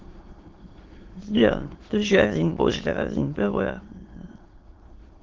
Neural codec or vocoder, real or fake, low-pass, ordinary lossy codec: autoencoder, 22.05 kHz, a latent of 192 numbers a frame, VITS, trained on many speakers; fake; 7.2 kHz; Opus, 16 kbps